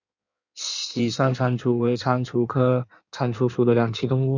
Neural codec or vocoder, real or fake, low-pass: codec, 16 kHz in and 24 kHz out, 1.1 kbps, FireRedTTS-2 codec; fake; 7.2 kHz